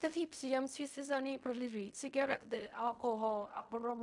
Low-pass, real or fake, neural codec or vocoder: 10.8 kHz; fake; codec, 16 kHz in and 24 kHz out, 0.4 kbps, LongCat-Audio-Codec, fine tuned four codebook decoder